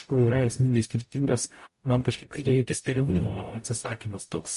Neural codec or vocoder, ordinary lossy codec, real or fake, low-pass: codec, 44.1 kHz, 0.9 kbps, DAC; MP3, 48 kbps; fake; 14.4 kHz